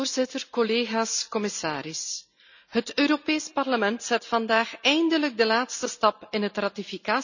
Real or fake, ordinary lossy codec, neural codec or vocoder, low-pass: real; none; none; 7.2 kHz